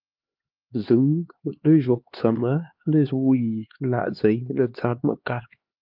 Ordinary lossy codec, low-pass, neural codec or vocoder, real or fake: Opus, 24 kbps; 5.4 kHz; codec, 16 kHz, 2 kbps, X-Codec, HuBERT features, trained on LibriSpeech; fake